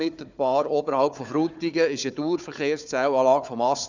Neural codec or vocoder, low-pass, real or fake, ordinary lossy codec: codec, 16 kHz, 4 kbps, FunCodec, trained on Chinese and English, 50 frames a second; 7.2 kHz; fake; none